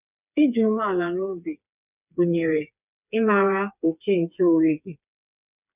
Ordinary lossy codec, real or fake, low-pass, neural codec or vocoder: none; fake; 3.6 kHz; codec, 16 kHz, 4 kbps, FreqCodec, smaller model